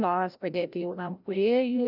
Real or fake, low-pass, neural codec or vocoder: fake; 5.4 kHz; codec, 16 kHz, 0.5 kbps, FreqCodec, larger model